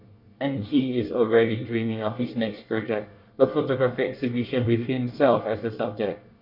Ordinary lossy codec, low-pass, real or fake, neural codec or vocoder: MP3, 48 kbps; 5.4 kHz; fake; codec, 24 kHz, 1 kbps, SNAC